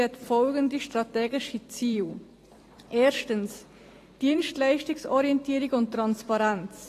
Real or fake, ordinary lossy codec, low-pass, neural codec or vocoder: fake; AAC, 48 kbps; 14.4 kHz; vocoder, 44.1 kHz, 128 mel bands every 256 samples, BigVGAN v2